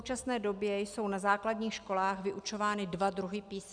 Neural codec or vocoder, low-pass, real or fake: none; 9.9 kHz; real